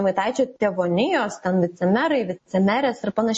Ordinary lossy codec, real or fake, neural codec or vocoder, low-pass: MP3, 32 kbps; real; none; 10.8 kHz